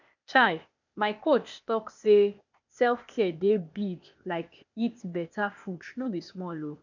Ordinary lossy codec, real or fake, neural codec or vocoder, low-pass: none; fake; codec, 16 kHz, 0.8 kbps, ZipCodec; 7.2 kHz